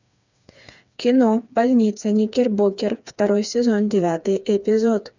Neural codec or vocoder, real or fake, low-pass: codec, 16 kHz, 4 kbps, FreqCodec, smaller model; fake; 7.2 kHz